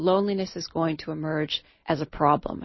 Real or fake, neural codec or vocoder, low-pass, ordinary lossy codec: real; none; 7.2 kHz; MP3, 24 kbps